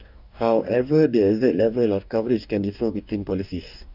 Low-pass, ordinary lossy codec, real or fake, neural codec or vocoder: 5.4 kHz; none; fake; codec, 44.1 kHz, 2.6 kbps, DAC